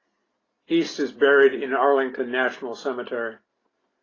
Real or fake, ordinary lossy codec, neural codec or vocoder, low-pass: real; AAC, 32 kbps; none; 7.2 kHz